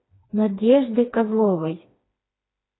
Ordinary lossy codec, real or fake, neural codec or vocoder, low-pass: AAC, 16 kbps; fake; codec, 16 kHz, 2 kbps, FreqCodec, smaller model; 7.2 kHz